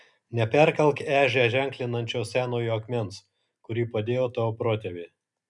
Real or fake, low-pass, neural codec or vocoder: real; 10.8 kHz; none